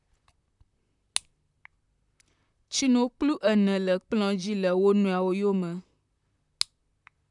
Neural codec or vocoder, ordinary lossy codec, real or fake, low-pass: vocoder, 44.1 kHz, 128 mel bands, Pupu-Vocoder; none; fake; 10.8 kHz